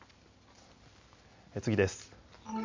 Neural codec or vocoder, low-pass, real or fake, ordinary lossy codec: none; 7.2 kHz; real; MP3, 48 kbps